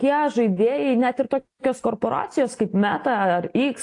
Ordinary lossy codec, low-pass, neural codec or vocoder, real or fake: AAC, 48 kbps; 10.8 kHz; none; real